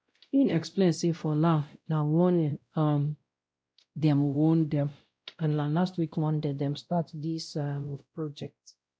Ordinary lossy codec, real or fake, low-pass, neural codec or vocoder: none; fake; none; codec, 16 kHz, 0.5 kbps, X-Codec, WavLM features, trained on Multilingual LibriSpeech